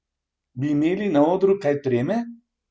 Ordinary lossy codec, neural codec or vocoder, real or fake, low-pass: none; none; real; none